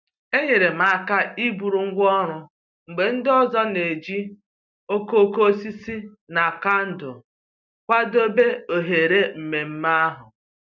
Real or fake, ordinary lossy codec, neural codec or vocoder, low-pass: real; none; none; 7.2 kHz